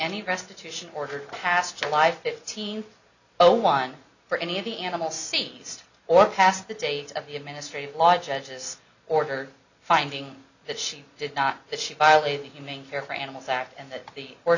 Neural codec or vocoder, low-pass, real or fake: none; 7.2 kHz; real